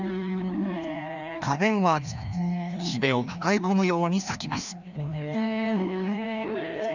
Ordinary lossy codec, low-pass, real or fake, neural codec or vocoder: none; 7.2 kHz; fake; codec, 16 kHz, 1 kbps, FreqCodec, larger model